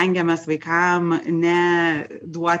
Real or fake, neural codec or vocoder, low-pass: real; none; 9.9 kHz